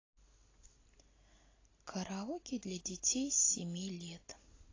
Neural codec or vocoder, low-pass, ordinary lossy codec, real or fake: none; 7.2 kHz; AAC, 32 kbps; real